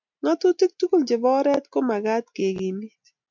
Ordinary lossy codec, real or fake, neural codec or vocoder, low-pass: MP3, 64 kbps; real; none; 7.2 kHz